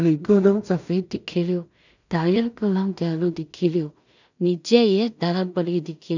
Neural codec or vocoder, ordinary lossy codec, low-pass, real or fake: codec, 16 kHz in and 24 kHz out, 0.4 kbps, LongCat-Audio-Codec, two codebook decoder; none; 7.2 kHz; fake